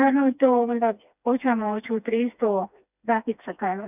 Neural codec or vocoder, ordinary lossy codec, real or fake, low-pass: codec, 16 kHz, 2 kbps, FreqCodec, smaller model; none; fake; 3.6 kHz